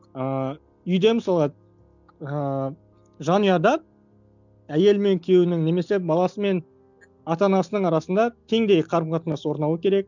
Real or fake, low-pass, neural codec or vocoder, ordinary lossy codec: real; 7.2 kHz; none; none